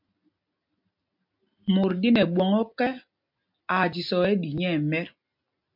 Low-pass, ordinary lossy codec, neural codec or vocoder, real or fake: 5.4 kHz; MP3, 48 kbps; none; real